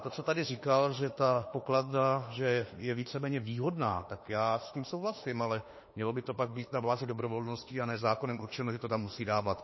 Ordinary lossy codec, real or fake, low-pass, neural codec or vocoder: MP3, 24 kbps; fake; 7.2 kHz; autoencoder, 48 kHz, 32 numbers a frame, DAC-VAE, trained on Japanese speech